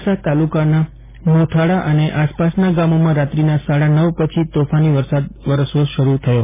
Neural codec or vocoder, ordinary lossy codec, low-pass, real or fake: none; MP3, 16 kbps; 3.6 kHz; real